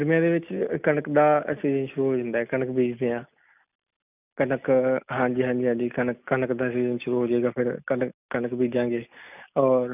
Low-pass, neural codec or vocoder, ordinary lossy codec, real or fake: 3.6 kHz; none; none; real